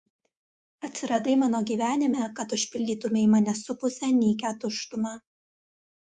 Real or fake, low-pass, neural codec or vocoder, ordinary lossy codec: fake; 10.8 kHz; codec, 24 kHz, 3.1 kbps, DualCodec; Opus, 64 kbps